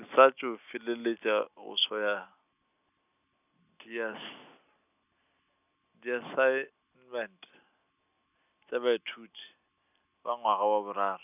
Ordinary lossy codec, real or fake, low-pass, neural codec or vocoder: none; real; 3.6 kHz; none